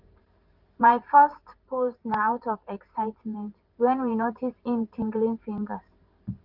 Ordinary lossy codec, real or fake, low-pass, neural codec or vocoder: Opus, 32 kbps; fake; 5.4 kHz; vocoder, 44.1 kHz, 128 mel bands every 512 samples, BigVGAN v2